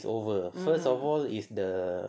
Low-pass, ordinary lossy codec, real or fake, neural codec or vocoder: none; none; real; none